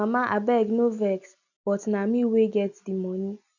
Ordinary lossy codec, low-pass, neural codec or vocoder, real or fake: none; 7.2 kHz; none; real